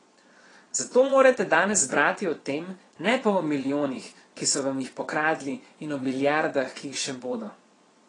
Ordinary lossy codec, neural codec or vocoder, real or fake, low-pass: AAC, 32 kbps; vocoder, 22.05 kHz, 80 mel bands, Vocos; fake; 9.9 kHz